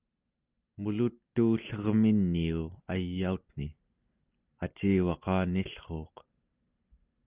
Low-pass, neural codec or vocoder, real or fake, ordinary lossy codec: 3.6 kHz; none; real; Opus, 24 kbps